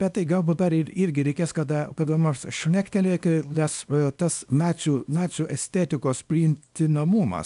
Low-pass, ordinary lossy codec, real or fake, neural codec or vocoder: 10.8 kHz; AAC, 96 kbps; fake; codec, 24 kHz, 0.9 kbps, WavTokenizer, small release